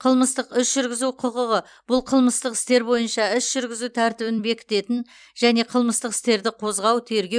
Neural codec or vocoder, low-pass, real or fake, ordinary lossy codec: vocoder, 44.1 kHz, 128 mel bands every 256 samples, BigVGAN v2; 9.9 kHz; fake; none